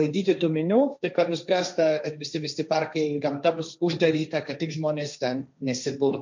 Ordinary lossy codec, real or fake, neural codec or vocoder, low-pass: MP3, 64 kbps; fake; codec, 16 kHz, 1.1 kbps, Voila-Tokenizer; 7.2 kHz